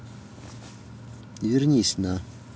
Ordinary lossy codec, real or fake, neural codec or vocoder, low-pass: none; real; none; none